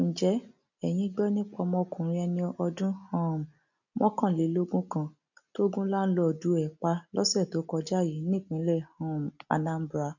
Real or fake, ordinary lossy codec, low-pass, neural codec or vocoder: real; none; 7.2 kHz; none